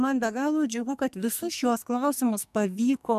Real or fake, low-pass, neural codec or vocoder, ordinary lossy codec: fake; 14.4 kHz; codec, 44.1 kHz, 2.6 kbps, SNAC; MP3, 96 kbps